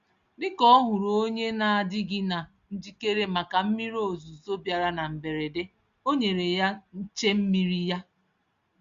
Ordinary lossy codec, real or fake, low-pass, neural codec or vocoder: Opus, 64 kbps; real; 7.2 kHz; none